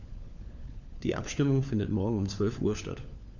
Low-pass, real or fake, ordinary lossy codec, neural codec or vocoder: 7.2 kHz; fake; none; codec, 16 kHz, 4 kbps, FunCodec, trained on LibriTTS, 50 frames a second